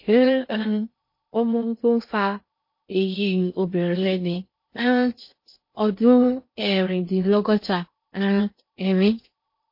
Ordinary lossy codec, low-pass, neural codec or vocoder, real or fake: MP3, 32 kbps; 5.4 kHz; codec, 16 kHz in and 24 kHz out, 0.6 kbps, FocalCodec, streaming, 2048 codes; fake